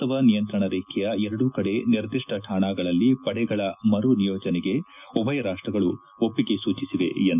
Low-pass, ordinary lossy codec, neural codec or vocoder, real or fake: 3.6 kHz; none; none; real